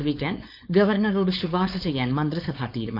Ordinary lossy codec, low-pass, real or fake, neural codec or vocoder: none; 5.4 kHz; fake; codec, 16 kHz, 4.8 kbps, FACodec